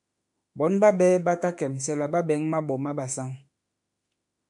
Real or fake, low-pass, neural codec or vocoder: fake; 10.8 kHz; autoencoder, 48 kHz, 32 numbers a frame, DAC-VAE, trained on Japanese speech